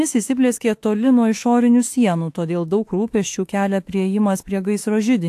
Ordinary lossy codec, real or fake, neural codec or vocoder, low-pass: AAC, 64 kbps; fake; autoencoder, 48 kHz, 32 numbers a frame, DAC-VAE, trained on Japanese speech; 14.4 kHz